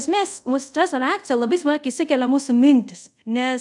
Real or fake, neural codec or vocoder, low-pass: fake; codec, 24 kHz, 0.5 kbps, DualCodec; 10.8 kHz